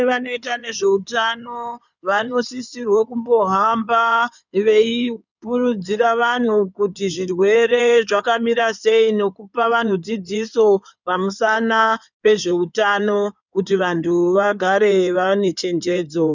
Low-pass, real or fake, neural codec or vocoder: 7.2 kHz; fake; codec, 16 kHz in and 24 kHz out, 2.2 kbps, FireRedTTS-2 codec